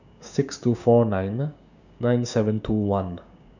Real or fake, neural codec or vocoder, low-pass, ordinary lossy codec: fake; codec, 16 kHz, 6 kbps, DAC; 7.2 kHz; none